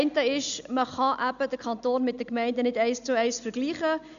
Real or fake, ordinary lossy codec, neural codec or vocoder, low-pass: real; none; none; 7.2 kHz